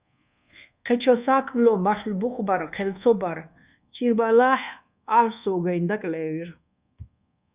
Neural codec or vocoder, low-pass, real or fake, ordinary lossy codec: codec, 24 kHz, 1.2 kbps, DualCodec; 3.6 kHz; fake; Opus, 64 kbps